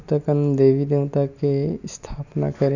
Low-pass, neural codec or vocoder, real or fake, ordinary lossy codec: 7.2 kHz; none; real; none